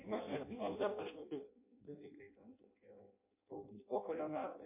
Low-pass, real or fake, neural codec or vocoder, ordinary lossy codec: 3.6 kHz; fake; codec, 16 kHz in and 24 kHz out, 0.6 kbps, FireRedTTS-2 codec; MP3, 24 kbps